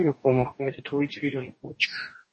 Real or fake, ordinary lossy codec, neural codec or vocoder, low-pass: fake; MP3, 32 kbps; codec, 44.1 kHz, 2.6 kbps, DAC; 10.8 kHz